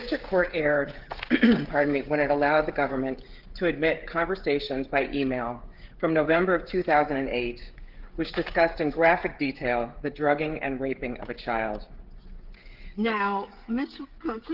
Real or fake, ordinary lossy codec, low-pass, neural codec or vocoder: fake; Opus, 16 kbps; 5.4 kHz; codec, 16 kHz, 16 kbps, FreqCodec, smaller model